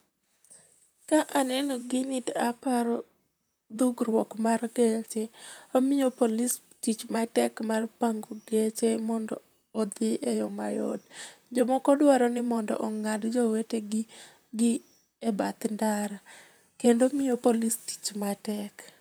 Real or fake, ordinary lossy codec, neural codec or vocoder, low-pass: fake; none; vocoder, 44.1 kHz, 128 mel bands, Pupu-Vocoder; none